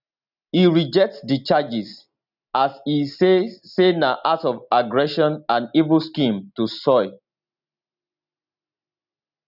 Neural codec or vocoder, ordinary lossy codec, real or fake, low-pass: none; none; real; 5.4 kHz